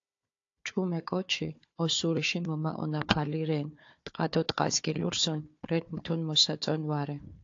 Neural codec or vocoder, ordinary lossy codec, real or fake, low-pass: codec, 16 kHz, 4 kbps, FunCodec, trained on Chinese and English, 50 frames a second; AAC, 48 kbps; fake; 7.2 kHz